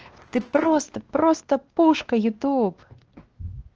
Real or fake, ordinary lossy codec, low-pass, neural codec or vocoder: fake; Opus, 16 kbps; 7.2 kHz; codec, 16 kHz, 2 kbps, X-Codec, WavLM features, trained on Multilingual LibriSpeech